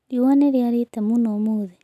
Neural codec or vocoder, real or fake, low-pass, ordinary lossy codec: none; real; 14.4 kHz; none